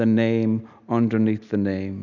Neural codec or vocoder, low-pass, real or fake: none; 7.2 kHz; real